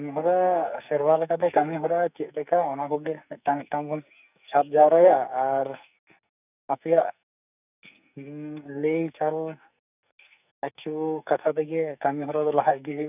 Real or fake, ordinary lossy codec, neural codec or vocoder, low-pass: fake; none; codec, 44.1 kHz, 2.6 kbps, SNAC; 3.6 kHz